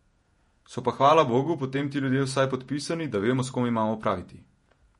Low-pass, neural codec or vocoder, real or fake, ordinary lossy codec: 19.8 kHz; vocoder, 48 kHz, 128 mel bands, Vocos; fake; MP3, 48 kbps